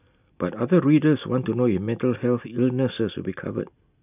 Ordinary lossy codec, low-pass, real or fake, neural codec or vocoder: none; 3.6 kHz; real; none